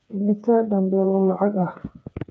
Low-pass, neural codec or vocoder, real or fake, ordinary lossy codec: none; codec, 16 kHz, 4 kbps, FreqCodec, smaller model; fake; none